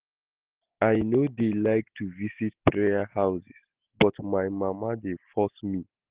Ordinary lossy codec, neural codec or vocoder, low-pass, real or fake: Opus, 16 kbps; none; 3.6 kHz; real